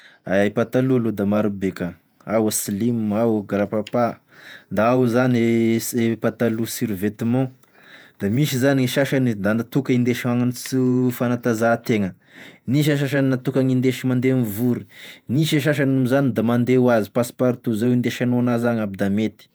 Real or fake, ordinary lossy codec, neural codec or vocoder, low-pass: fake; none; vocoder, 48 kHz, 128 mel bands, Vocos; none